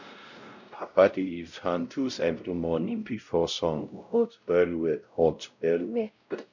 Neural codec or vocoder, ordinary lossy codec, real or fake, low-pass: codec, 16 kHz, 0.5 kbps, X-Codec, WavLM features, trained on Multilingual LibriSpeech; none; fake; 7.2 kHz